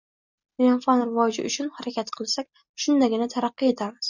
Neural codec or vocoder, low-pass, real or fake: none; 7.2 kHz; real